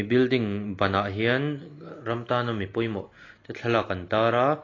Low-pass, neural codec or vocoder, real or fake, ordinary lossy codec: 7.2 kHz; none; real; AAC, 32 kbps